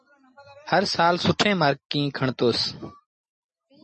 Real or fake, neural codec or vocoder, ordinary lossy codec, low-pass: real; none; MP3, 32 kbps; 10.8 kHz